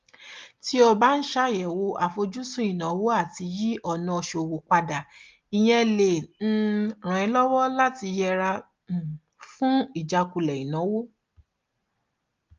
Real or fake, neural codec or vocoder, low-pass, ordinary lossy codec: real; none; 7.2 kHz; Opus, 32 kbps